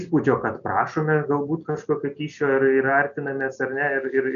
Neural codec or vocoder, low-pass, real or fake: none; 7.2 kHz; real